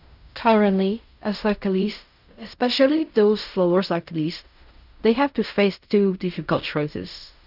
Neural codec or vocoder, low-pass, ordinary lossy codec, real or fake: codec, 16 kHz in and 24 kHz out, 0.4 kbps, LongCat-Audio-Codec, fine tuned four codebook decoder; 5.4 kHz; none; fake